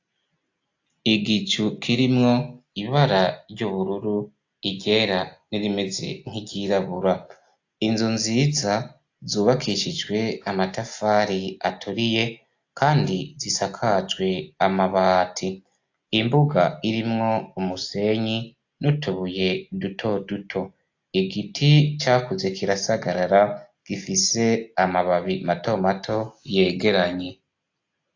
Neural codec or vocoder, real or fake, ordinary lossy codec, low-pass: none; real; AAC, 48 kbps; 7.2 kHz